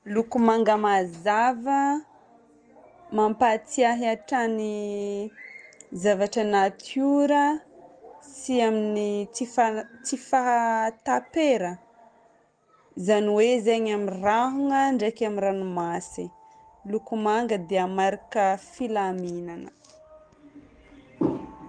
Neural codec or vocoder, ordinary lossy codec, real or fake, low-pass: none; Opus, 24 kbps; real; 9.9 kHz